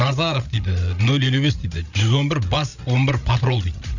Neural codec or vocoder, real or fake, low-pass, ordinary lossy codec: codec, 16 kHz, 16 kbps, FreqCodec, larger model; fake; 7.2 kHz; none